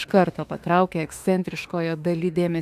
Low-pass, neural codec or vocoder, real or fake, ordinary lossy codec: 14.4 kHz; autoencoder, 48 kHz, 32 numbers a frame, DAC-VAE, trained on Japanese speech; fake; AAC, 96 kbps